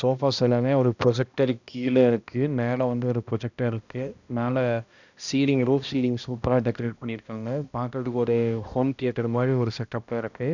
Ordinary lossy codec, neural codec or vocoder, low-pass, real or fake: none; codec, 16 kHz, 1 kbps, X-Codec, HuBERT features, trained on balanced general audio; 7.2 kHz; fake